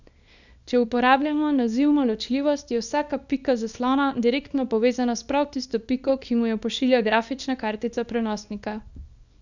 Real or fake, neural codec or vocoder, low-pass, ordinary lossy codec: fake; codec, 24 kHz, 0.9 kbps, WavTokenizer, small release; 7.2 kHz; none